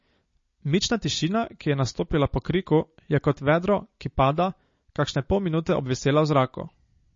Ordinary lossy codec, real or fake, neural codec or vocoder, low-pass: MP3, 32 kbps; real; none; 7.2 kHz